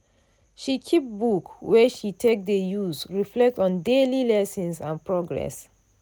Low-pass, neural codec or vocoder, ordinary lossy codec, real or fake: none; none; none; real